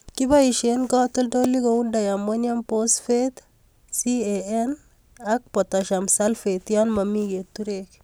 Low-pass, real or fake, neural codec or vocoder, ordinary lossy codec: none; real; none; none